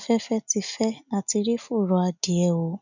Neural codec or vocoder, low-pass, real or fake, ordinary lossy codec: none; 7.2 kHz; real; none